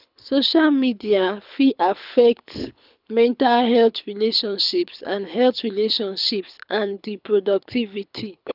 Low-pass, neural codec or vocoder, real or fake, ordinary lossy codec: 5.4 kHz; codec, 24 kHz, 6 kbps, HILCodec; fake; none